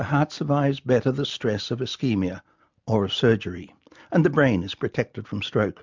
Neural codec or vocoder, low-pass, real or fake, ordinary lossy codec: none; 7.2 kHz; real; MP3, 64 kbps